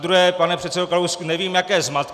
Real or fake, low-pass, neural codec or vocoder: real; 14.4 kHz; none